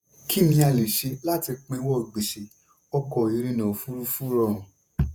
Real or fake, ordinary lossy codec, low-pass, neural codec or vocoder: fake; none; none; vocoder, 48 kHz, 128 mel bands, Vocos